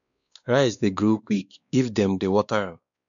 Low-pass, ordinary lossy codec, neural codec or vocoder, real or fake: 7.2 kHz; none; codec, 16 kHz, 2 kbps, X-Codec, WavLM features, trained on Multilingual LibriSpeech; fake